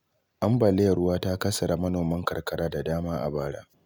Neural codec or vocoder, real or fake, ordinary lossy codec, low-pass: none; real; none; none